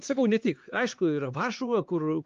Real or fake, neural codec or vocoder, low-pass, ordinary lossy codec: fake; codec, 16 kHz, 4 kbps, X-Codec, HuBERT features, trained on LibriSpeech; 7.2 kHz; Opus, 32 kbps